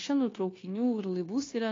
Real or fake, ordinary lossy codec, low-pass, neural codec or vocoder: fake; AAC, 32 kbps; 7.2 kHz; codec, 16 kHz, 0.9 kbps, LongCat-Audio-Codec